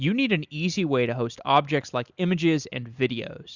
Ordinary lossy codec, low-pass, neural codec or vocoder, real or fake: Opus, 64 kbps; 7.2 kHz; none; real